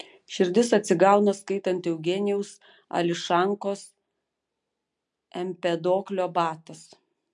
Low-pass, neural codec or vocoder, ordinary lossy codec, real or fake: 10.8 kHz; none; MP3, 64 kbps; real